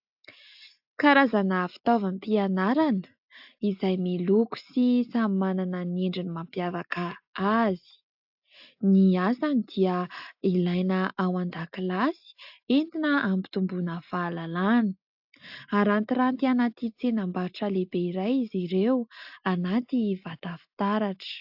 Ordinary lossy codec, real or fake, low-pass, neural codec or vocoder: AAC, 48 kbps; real; 5.4 kHz; none